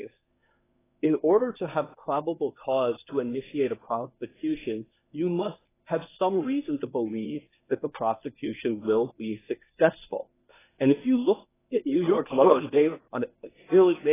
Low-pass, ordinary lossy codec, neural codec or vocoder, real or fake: 3.6 kHz; AAC, 16 kbps; codec, 24 kHz, 0.9 kbps, WavTokenizer, medium speech release version 1; fake